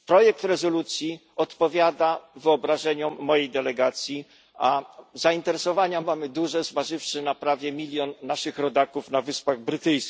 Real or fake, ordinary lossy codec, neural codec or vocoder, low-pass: real; none; none; none